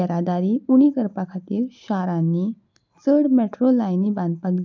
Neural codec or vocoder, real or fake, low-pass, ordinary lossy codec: none; real; 7.2 kHz; MP3, 64 kbps